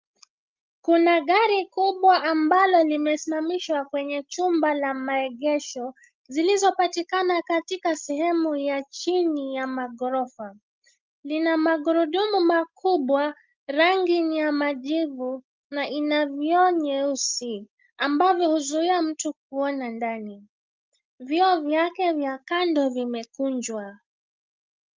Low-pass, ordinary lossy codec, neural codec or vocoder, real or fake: 7.2 kHz; Opus, 24 kbps; none; real